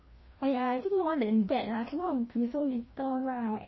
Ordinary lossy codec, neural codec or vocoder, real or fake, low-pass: MP3, 24 kbps; codec, 16 kHz, 1 kbps, FreqCodec, larger model; fake; 7.2 kHz